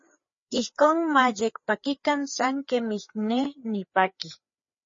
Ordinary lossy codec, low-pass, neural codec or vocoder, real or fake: MP3, 32 kbps; 7.2 kHz; codec, 16 kHz, 4 kbps, FreqCodec, larger model; fake